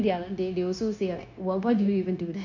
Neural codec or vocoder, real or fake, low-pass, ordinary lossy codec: codec, 16 kHz, 0.9 kbps, LongCat-Audio-Codec; fake; 7.2 kHz; none